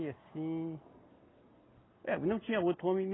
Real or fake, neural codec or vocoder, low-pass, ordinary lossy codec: real; none; 7.2 kHz; AAC, 16 kbps